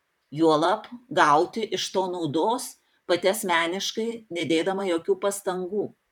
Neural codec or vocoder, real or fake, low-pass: vocoder, 44.1 kHz, 128 mel bands, Pupu-Vocoder; fake; 19.8 kHz